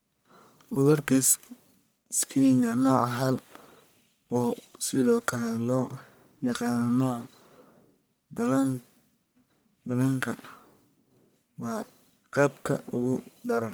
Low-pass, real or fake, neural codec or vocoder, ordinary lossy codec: none; fake; codec, 44.1 kHz, 1.7 kbps, Pupu-Codec; none